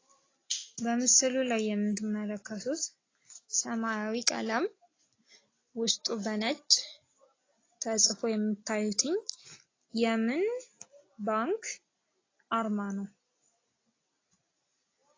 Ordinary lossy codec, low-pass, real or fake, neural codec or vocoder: AAC, 32 kbps; 7.2 kHz; real; none